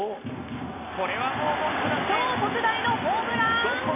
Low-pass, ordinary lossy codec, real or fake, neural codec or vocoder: 3.6 kHz; AAC, 24 kbps; real; none